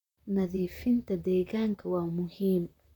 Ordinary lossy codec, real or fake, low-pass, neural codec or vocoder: none; fake; 19.8 kHz; vocoder, 44.1 kHz, 128 mel bands, Pupu-Vocoder